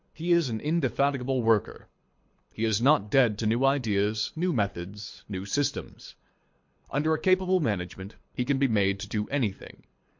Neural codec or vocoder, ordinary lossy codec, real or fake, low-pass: codec, 24 kHz, 6 kbps, HILCodec; MP3, 48 kbps; fake; 7.2 kHz